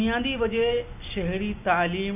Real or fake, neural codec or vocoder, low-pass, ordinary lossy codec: real; none; 3.6 kHz; none